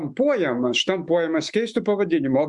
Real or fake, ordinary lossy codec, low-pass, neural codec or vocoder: fake; Opus, 64 kbps; 10.8 kHz; autoencoder, 48 kHz, 128 numbers a frame, DAC-VAE, trained on Japanese speech